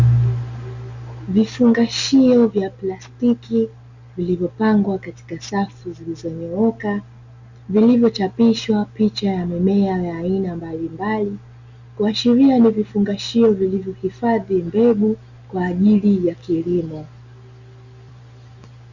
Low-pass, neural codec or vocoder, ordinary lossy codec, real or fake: 7.2 kHz; none; Opus, 64 kbps; real